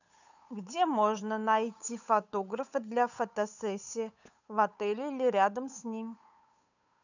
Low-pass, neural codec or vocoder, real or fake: 7.2 kHz; codec, 16 kHz, 8 kbps, FunCodec, trained on Chinese and English, 25 frames a second; fake